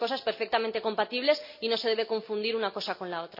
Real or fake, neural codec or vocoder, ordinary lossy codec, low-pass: real; none; none; 5.4 kHz